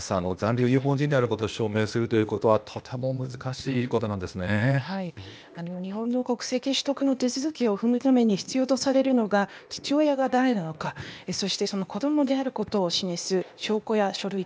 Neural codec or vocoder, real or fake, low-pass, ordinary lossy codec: codec, 16 kHz, 0.8 kbps, ZipCodec; fake; none; none